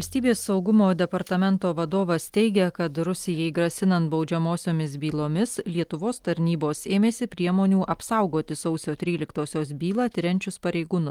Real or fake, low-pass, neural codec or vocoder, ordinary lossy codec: real; 19.8 kHz; none; Opus, 24 kbps